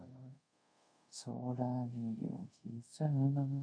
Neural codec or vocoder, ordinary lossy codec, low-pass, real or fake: codec, 24 kHz, 0.5 kbps, DualCodec; MP3, 48 kbps; 9.9 kHz; fake